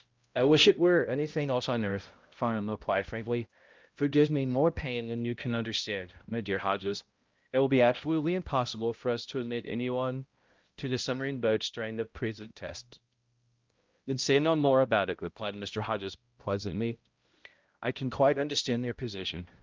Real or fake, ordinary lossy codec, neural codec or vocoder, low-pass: fake; Opus, 32 kbps; codec, 16 kHz, 0.5 kbps, X-Codec, HuBERT features, trained on balanced general audio; 7.2 kHz